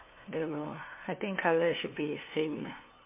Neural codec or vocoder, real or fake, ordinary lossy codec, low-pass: codec, 16 kHz, 2 kbps, FunCodec, trained on LibriTTS, 25 frames a second; fake; MP3, 24 kbps; 3.6 kHz